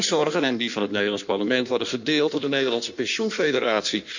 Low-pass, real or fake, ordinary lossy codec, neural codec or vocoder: 7.2 kHz; fake; none; codec, 16 kHz in and 24 kHz out, 1.1 kbps, FireRedTTS-2 codec